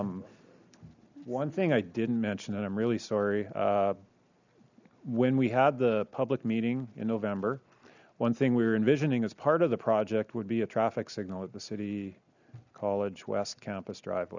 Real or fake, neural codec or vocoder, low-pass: real; none; 7.2 kHz